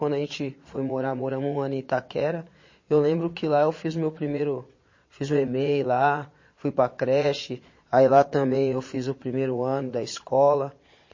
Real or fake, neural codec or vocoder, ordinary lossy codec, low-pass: fake; vocoder, 22.05 kHz, 80 mel bands, Vocos; MP3, 32 kbps; 7.2 kHz